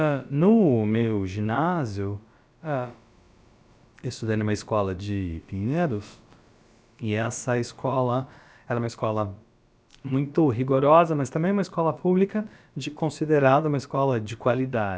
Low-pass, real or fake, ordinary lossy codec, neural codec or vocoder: none; fake; none; codec, 16 kHz, about 1 kbps, DyCAST, with the encoder's durations